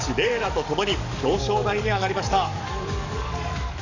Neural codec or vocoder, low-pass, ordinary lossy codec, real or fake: codec, 44.1 kHz, 7.8 kbps, DAC; 7.2 kHz; none; fake